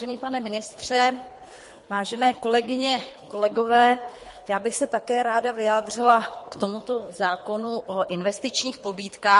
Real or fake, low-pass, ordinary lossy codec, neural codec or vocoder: fake; 10.8 kHz; MP3, 48 kbps; codec, 24 kHz, 3 kbps, HILCodec